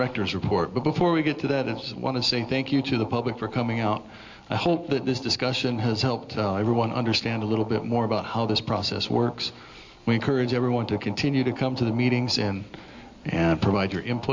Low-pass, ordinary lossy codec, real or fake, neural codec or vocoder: 7.2 kHz; MP3, 48 kbps; real; none